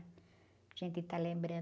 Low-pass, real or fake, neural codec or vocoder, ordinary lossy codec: none; real; none; none